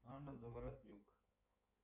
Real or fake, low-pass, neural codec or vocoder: fake; 3.6 kHz; codec, 16 kHz in and 24 kHz out, 1.1 kbps, FireRedTTS-2 codec